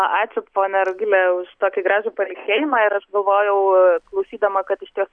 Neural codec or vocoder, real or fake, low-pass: none; real; 9.9 kHz